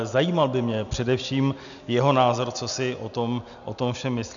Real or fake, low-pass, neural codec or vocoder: real; 7.2 kHz; none